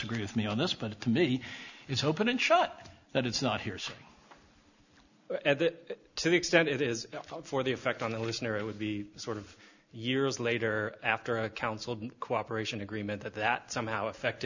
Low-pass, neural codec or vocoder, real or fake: 7.2 kHz; none; real